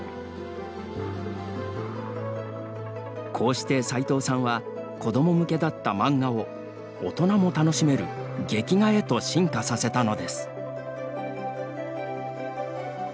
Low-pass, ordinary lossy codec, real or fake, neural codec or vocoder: none; none; real; none